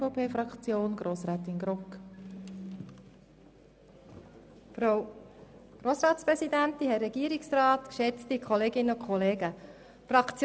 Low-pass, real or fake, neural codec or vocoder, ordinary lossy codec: none; real; none; none